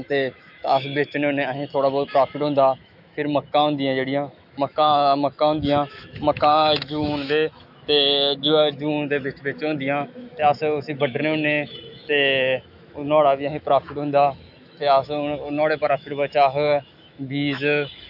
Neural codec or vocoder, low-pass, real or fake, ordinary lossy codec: autoencoder, 48 kHz, 128 numbers a frame, DAC-VAE, trained on Japanese speech; 5.4 kHz; fake; AAC, 48 kbps